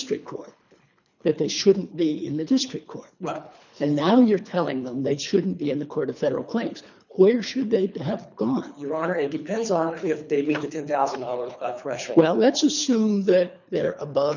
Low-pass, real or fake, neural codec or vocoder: 7.2 kHz; fake; codec, 24 kHz, 3 kbps, HILCodec